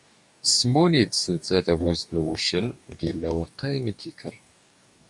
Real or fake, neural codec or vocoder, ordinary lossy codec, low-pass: fake; codec, 44.1 kHz, 2.6 kbps, DAC; MP3, 96 kbps; 10.8 kHz